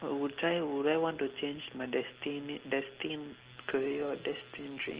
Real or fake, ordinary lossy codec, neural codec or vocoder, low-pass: real; Opus, 16 kbps; none; 3.6 kHz